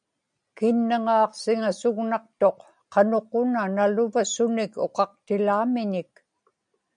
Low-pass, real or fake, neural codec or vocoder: 9.9 kHz; real; none